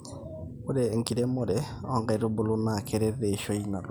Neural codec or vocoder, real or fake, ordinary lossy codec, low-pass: none; real; none; none